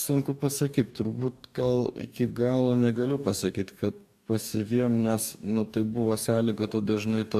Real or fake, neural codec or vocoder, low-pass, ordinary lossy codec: fake; codec, 44.1 kHz, 2.6 kbps, DAC; 14.4 kHz; AAC, 96 kbps